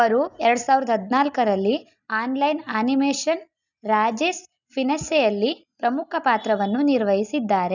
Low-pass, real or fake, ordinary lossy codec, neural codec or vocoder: 7.2 kHz; real; none; none